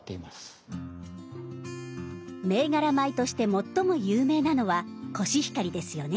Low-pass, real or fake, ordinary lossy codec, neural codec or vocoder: none; real; none; none